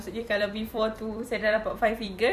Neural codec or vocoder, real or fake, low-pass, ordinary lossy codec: vocoder, 44.1 kHz, 128 mel bands every 256 samples, BigVGAN v2; fake; 14.4 kHz; none